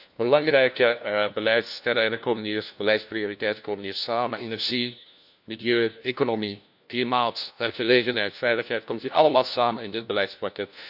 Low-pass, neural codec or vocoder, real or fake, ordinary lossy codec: 5.4 kHz; codec, 16 kHz, 1 kbps, FunCodec, trained on LibriTTS, 50 frames a second; fake; none